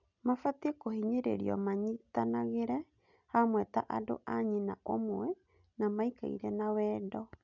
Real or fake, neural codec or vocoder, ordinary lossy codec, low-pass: real; none; none; 7.2 kHz